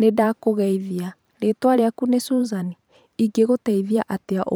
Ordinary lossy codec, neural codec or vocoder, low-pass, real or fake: none; vocoder, 44.1 kHz, 128 mel bands every 512 samples, BigVGAN v2; none; fake